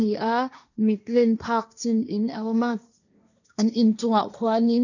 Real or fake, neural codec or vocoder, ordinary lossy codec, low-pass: fake; codec, 16 kHz, 1.1 kbps, Voila-Tokenizer; none; 7.2 kHz